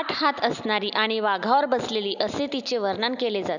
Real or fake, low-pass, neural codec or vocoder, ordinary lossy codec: real; 7.2 kHz; none; none